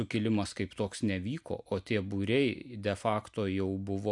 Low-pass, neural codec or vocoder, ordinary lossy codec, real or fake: 10.8 kHz; none; MP3, 96 kbps; real